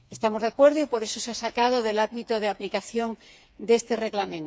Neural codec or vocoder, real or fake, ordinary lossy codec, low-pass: codec, 16 kHz, 4 kbps, FreqCodec, smaller model; fake; none; none